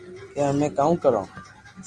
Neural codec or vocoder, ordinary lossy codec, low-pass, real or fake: none; Opus, 32 kbps; 9.9 kHz; real